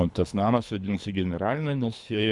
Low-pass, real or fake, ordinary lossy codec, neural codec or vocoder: 10.8 kHz; fake; Opus, 64 kbps; codec, 24 kHz, 3 kbps, HILCodec